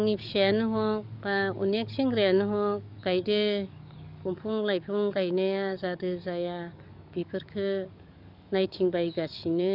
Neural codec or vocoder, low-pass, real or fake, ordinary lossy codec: codec, 44.1 kHz, 7.8 kbps, DAC; 5.4 kHz; fake; none